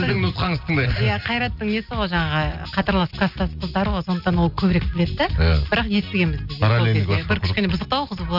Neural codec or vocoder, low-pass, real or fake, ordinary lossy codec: none; 5.4 kHz; real; none